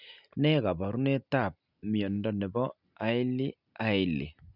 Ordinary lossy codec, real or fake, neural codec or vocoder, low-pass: none; real; none; 5.4 kHz